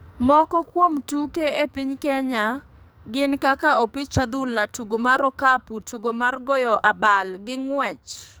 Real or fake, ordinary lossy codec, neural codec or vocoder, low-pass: fake; none; codec, 44.1 kHz, 2.6 kbps, SNAC; none